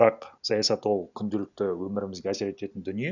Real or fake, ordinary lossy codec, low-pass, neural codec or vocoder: real; none; 7.2 kHz; none